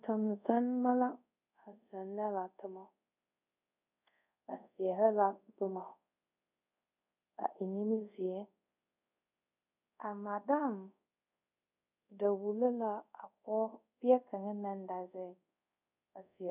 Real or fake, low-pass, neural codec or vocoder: fake; 3.6 kHz; codec, 24 kHz, 0.5 kbps, DualCodec